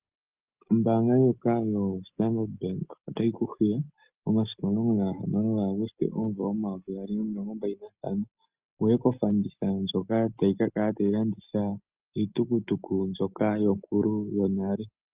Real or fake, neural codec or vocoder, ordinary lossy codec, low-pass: real; none; Opus, 24 kbps; 3.6 kHz